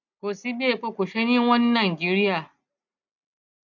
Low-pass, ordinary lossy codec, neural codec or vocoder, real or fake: 7.2 kHz; none; none; real